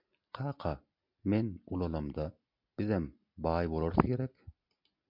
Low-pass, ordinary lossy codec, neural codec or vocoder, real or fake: 5.4 kHz; MP3, 48 kbps; none; real